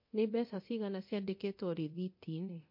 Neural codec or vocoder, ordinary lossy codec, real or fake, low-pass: codec, 24 kHz, 0.9 kbps, DualCodec; MP3, 32 kbps; fake; 5.4 kHz